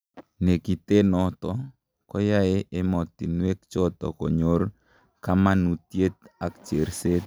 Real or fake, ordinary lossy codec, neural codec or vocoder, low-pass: real; none; none; none